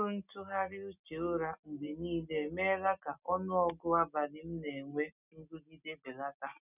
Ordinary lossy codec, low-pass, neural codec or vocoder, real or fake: none; 3.6 kHz; none; real